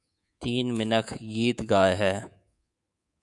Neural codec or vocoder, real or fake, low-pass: codec, 24 kHz, 3.1 kbps, DualCodec; fake; 10.8 kHz